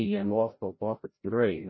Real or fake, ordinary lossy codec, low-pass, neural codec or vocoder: fake; MP3, 24 kbps; 7.2 kHz; codec, 16 kHz, 0.5 kbps, FreqCodec, larger model